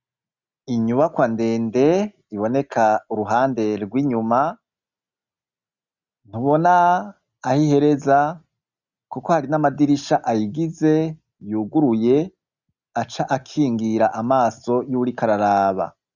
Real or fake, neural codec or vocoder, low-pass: real; none; 7.2 kHz